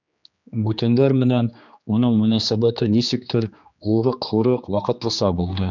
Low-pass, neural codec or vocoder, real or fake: 7.2 kHz; codec, 16 kHz, 2 kbps, X-Codec, HuBERT features, trained on general audio; fake